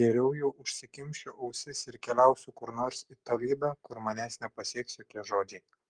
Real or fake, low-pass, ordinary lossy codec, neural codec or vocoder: fake; 9.9 kHz; Opus, 32 kbps; codec, 44.1 kHz, 7.8 kbps, Pupu-Codec